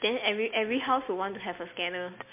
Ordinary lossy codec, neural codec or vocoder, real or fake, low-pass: MP3, 24 kbps; none; real; 3.6 kHz